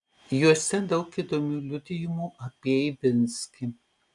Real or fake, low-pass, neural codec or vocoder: real; 10.8 kHz; none